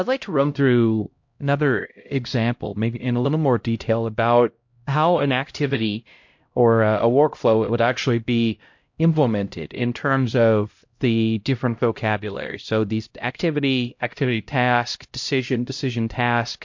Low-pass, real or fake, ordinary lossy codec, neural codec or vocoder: 7.2 kHz; fake; MP3, 48 kbps; codec, 16 kHz, 0.5 kbps, X-Codec, HuBERT features, trained on LibriSpeech